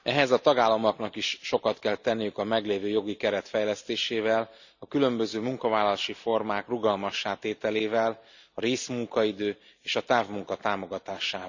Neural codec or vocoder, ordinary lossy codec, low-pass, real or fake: none; none; 7.2 kHz; real